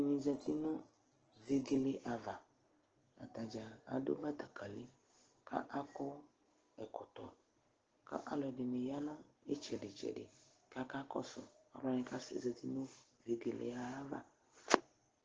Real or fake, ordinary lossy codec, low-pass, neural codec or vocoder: real; Opus, 16 kbps; 7.2 kHz; none